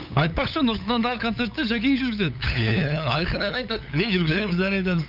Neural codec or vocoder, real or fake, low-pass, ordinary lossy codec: codec, 16 kHz, 8 kbps, FunCodec, trained on LibriTTS, 25 frames a second; fake; 5.4 kHz; none